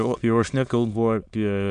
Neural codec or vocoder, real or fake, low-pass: autoencoder, 22.05 kHz, a latent of 192 numbers a frame, VITS, trained on many speakers; fake; 9.9 kHz